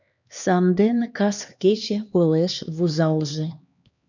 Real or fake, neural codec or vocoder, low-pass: fake; codec, 16 kHz, 2 kbps, X-Codec, HuBERT features, trained on LibriSpeech; 7.2 kHz